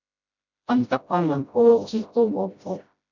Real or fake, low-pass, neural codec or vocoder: fake; 7.2 kHz; codec, 16 kHz, 0.5 kbps, FreqCodec, smaller model